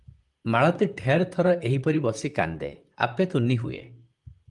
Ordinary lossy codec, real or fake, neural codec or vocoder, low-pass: Opus, 32 kbps; fake; vocoder, 44.1 kHz, 128 mel bands, Pupu-Vocoder; 10.8 kHz